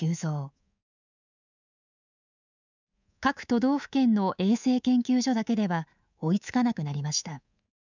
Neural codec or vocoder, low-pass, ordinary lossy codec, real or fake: codec, 24 kHz, 3.1 kbps, DualCodec; 7.2 kHz; none; fake